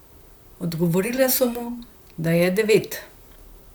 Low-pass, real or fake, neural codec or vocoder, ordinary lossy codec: none; fake; vocoder, 44.1 kHz, 128 mel bands, Pupu-Vocoder; none